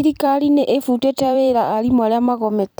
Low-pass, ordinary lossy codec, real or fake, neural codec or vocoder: none; none; fake; vocoder, 44.1 kHz, 128 mel bands every 256 samples, BigVGAN v2